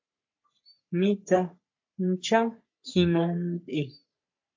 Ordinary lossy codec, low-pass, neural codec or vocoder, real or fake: MP3, 48 kbps; 7.2 kHz; codec, 44.1 kHz, 3.4 kbps, Pupu-Codec; fake